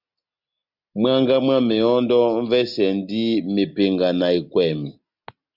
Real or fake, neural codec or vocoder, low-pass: real; none; 5.4 kHz